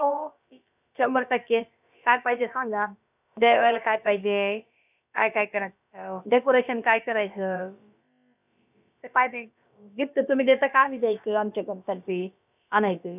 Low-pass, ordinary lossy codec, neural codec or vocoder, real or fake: 3.6 kHz; none; codec, 16 kHz, about 1 kbps, DyCAST, with the encoder's durations; fake